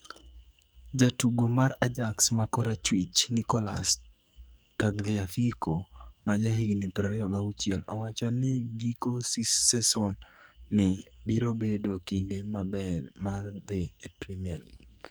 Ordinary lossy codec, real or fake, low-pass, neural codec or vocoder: none; fake; none; codec, 44.1 kHz, 2.6 kbps, SNAC